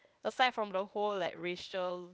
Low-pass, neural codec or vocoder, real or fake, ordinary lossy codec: none; codec, 16 kHz, 0.8 kbps, ZipCodec; fake; none